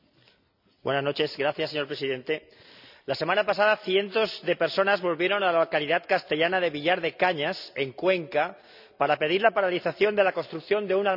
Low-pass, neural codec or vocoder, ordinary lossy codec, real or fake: 5.4 kHz; none; none; real